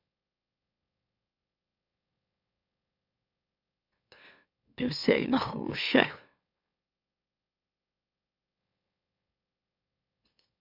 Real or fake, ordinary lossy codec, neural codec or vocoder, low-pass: fake; MP3, 48 kbps; autoencoder, 44.1 kHz, a latent of 192 numbers a frame, MeloTTS; 5.4 kHz